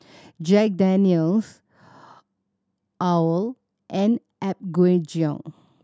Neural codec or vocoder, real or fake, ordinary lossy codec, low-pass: none; real; none; none